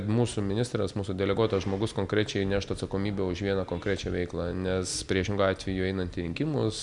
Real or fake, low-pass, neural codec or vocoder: real; 10.8 kHz; none